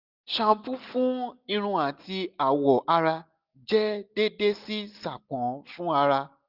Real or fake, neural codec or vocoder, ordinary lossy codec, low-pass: real; none; none; 5.4 kHz